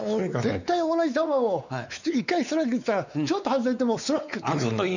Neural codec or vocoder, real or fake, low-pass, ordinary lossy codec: codec, 16 kHz, 4 kbps, X-Codec, WavLM features, trained on Multilingual LibriSpeech; fake; 7.2 kHz; none